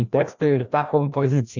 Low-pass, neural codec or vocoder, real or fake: 7.2 kHz; codec, 16 kHz, 1 kbps, FreqCodec, larger model; fake